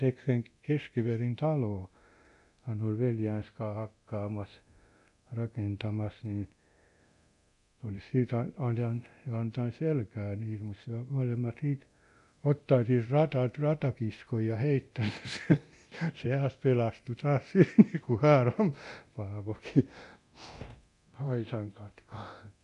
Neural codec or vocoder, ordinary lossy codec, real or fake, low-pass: codec, 24 kHz, 0.9 kbps, DualCodec; none; fake; 10.8 kHz